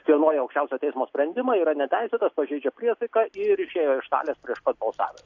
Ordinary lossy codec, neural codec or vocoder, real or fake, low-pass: AAC, 48 kbps; none; real; 7.2 kHz